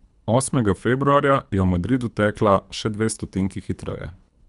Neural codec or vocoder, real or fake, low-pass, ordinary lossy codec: codec, 24 kHz, 3 kbps, HILCodec; fake; 10.8 kHz; none